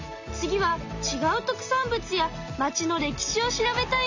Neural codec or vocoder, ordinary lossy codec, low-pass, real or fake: none; none; 7.2 kHz; real